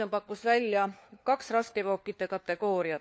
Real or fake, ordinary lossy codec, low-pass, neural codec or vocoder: fake; none; none; codec, 16 kHz, 4 kbps, FunCodec, trained on LibriTTS, 50 frames a second